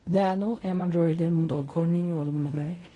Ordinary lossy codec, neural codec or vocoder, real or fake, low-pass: AAC, 32 kbps; codec, 16 kHz in and 24 kHz out, 0.4 kbps, LongCat-Audio-Codec, fine tuned four codebook decoder; fake; 10.8 kHz